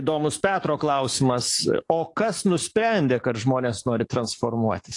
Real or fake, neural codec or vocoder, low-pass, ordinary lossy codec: fake; codec, 44.1 kHz, 7.8 kbps, DAC; 10.8 kHz; AAC, 48 kbps